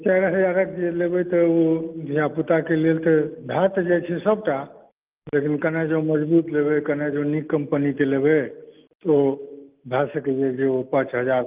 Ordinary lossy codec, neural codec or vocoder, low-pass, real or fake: Opus, 32 kbps; none; 3.6 kHz; real